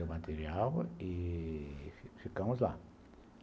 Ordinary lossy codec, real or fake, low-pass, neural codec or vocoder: none; real; none; none